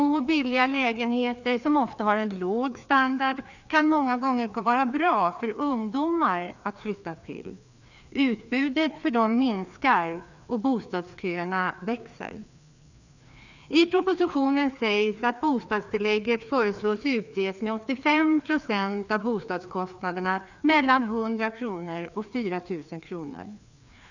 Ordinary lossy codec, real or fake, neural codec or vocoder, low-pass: none; fake; codec, 16 kHz, 2 kbps, FreqCodec, larger model; 7.2 kHz